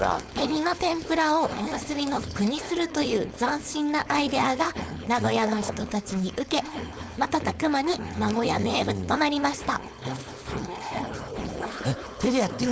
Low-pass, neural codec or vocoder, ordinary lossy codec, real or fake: none; codec, 16 kHz, 4.8 kbps, FACodec; none; fake